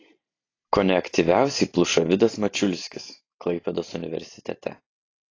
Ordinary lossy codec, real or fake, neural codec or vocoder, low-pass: AAC, 32 kbps; real; none; 7.2 kHz